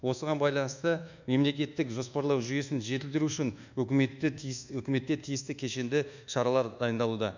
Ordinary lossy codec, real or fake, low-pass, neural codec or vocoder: none; fake; 7.2 kHz; codec, 24 kHz, 1.2 kbps, DualCodec